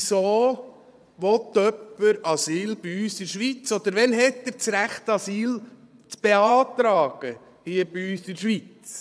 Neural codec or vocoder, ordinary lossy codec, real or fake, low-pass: vocoder, 22.05 kHz, 80 mel bands, Vocos; none; fake; none